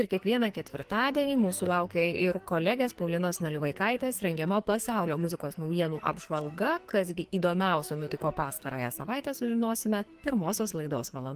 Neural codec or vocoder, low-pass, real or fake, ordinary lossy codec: codec, 44.1 kHz, 2.6 kbps, SNAC; 14.4 kHz; fake; Opus, 24 kbps